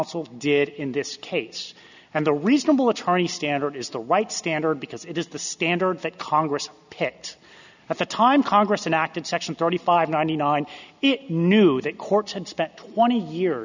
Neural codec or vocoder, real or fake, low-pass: none; real; 7.2 kHz